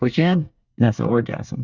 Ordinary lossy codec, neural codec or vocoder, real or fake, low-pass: Opus, 64 kbps; codec, 24 kHz, 1 kbps, SNAC; fake; 7.2 kHz